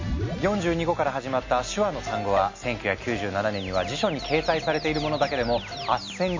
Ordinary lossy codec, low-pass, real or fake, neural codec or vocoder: MP3, 32 kbps; 7.2 kHz; real; none